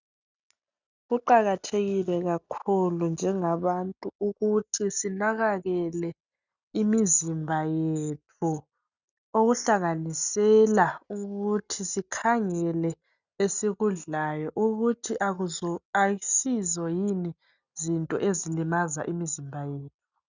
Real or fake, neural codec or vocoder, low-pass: real; none; 7.2 kHz